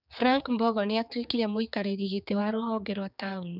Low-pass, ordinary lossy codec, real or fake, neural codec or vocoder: 5.4 kHz; none; fake; codec, 16 kHz, 4 kbps, X-Codec, HuBERT features, trained on general audio